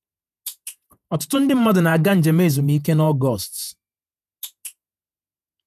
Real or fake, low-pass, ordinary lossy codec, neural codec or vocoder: fake; 14.4 kHz; none; vocoder, 48 kHz, 128 mel bands, Vocos